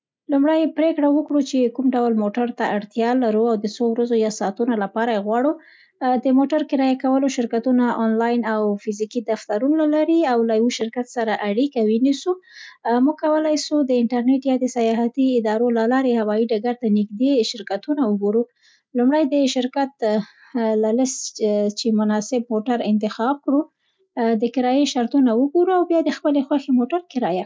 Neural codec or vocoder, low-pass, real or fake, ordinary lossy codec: none; none; real; none